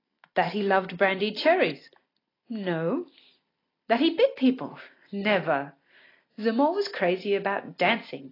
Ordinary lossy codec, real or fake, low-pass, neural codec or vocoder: AAC, 24 kbps; real; 5.4 kHz; none